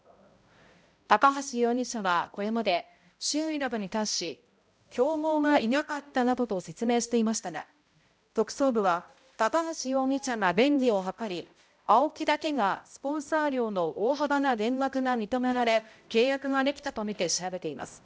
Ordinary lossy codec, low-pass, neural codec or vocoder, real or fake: none; none; codec, 16 kHz, 0.5 kbps, X-Codec, HuBERT features, trained on balanced general audio; fake